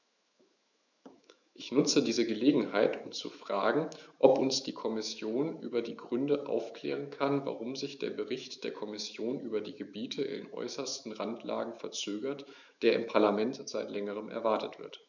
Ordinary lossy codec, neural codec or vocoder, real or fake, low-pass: none; autoencoder, 48 kHz, 128 numbers a frame, DAC-VAE, trained on Japanese speech; fake; 7.2 kHz